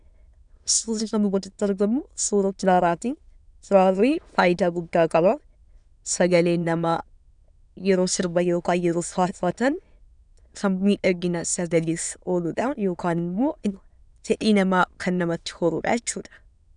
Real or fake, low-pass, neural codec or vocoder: fake; 9.9 kHz; autoencoder, 22.05 kHz, a latent of 192 numbers a frame, VITS, trained on many speakers